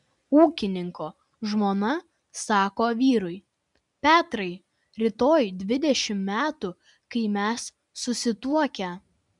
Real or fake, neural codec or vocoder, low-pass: real; none; 10.8 kHz